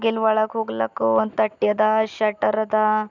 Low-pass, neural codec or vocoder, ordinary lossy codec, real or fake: 7.2 kHz; none; none; real